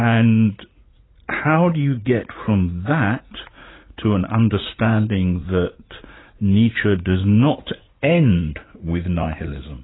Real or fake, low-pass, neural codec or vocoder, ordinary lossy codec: fake; 7.2 kHz; vocoder, 44.1 kHz, 80 mel bands, Vocos; AAC, 16 kbps